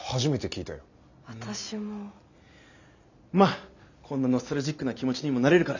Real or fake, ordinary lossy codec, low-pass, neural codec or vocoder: real; none; 7.2 kHz; none